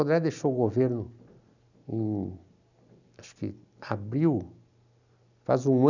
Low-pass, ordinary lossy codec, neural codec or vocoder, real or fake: 7.2 kHz; none; none; real